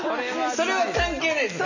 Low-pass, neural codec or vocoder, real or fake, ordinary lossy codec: 7.2 kHz; none; real; none